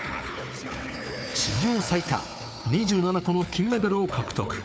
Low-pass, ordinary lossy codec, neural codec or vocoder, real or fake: none; none; codec, 16 kHz, 4 kbps, FunCodec, trained on Chinese and English, 50 frames a second; fake